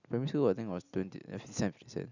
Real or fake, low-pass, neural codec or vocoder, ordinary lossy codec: real; 7.2 kHz; none; none